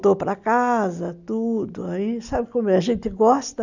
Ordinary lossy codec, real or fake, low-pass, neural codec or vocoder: none; real; 7.2 kHz; none